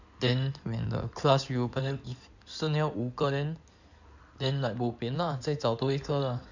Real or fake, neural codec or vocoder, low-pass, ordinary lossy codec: fake; codec, 16 kHz in and 24 kHz out, 2.2 kbps, FireRedTTS-2 codec; 7.2 kHz; none